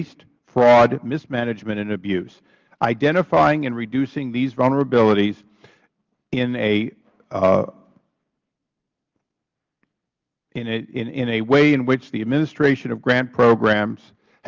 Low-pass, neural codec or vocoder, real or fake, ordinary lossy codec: 7.2 kHz; none; real; Opus, 24 kbps